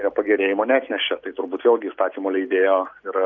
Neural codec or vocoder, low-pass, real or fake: none; 7.2 kHz; real